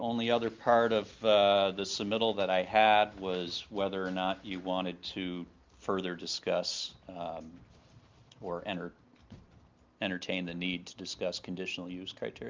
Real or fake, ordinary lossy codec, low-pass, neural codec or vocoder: real; Opus, 24 kbps; 7.2 kHz; none